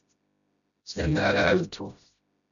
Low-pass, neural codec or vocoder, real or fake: 7.2 kHz; codec, 16 kHz, 0.5 kbps, FreqCodec, smaller model; fake